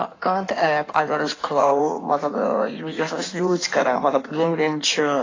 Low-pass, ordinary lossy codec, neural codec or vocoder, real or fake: 7.2 kHz; AAC, 32 kbps; codec, 16 kHz in and 24 kHz out, 1.1 kbps, FireRedTTS-2 codec; fake